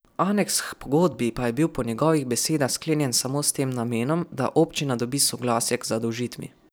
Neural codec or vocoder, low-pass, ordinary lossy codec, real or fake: vocoder, 44.1 kHz, 128 mel bands every 256 samples, BigVGAN v2; none; none; fake